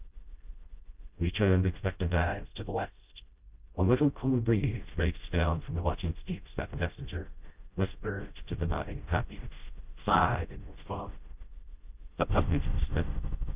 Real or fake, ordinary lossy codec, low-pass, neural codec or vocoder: fake; Opus, 24 kbps; 3.6 kHz; codec, 16 kHz, 0.5 kbps, FreqCodec, smaller model